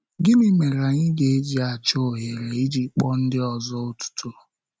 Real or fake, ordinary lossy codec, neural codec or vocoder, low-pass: real; none; none; none